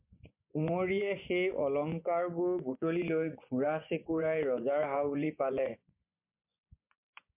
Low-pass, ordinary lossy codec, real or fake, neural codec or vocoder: 3.6 kHz; MP3, 32 kbps; fake; vocoder, 24 kHz, 100 mel bands, Vocos